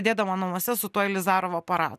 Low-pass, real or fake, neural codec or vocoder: 14.4 kHz; real; none